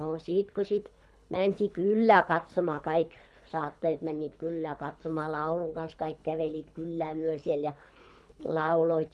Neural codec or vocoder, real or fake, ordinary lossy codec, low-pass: codec, 24 kHz, 3 kbps, HILCodec; fake; none; none